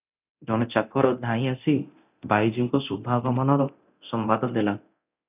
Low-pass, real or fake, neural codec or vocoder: 3.6 kHz; fake; codec, 24 kHz, 0.9 kbps, DualCodec